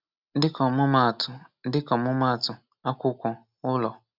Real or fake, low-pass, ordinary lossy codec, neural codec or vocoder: real; 5.4 kHz; none; none